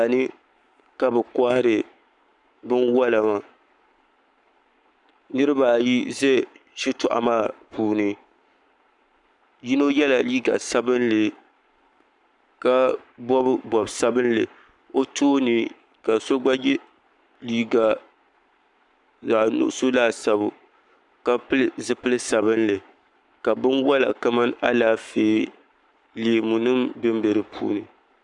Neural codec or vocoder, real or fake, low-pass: codec, 44.1 kHz, 7.8 kbps, Pupu-Codec; fake; 10.8 kHz